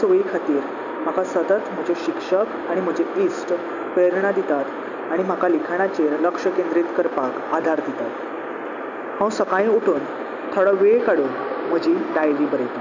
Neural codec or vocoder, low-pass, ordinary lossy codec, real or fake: none; 7.2 kHz; none; real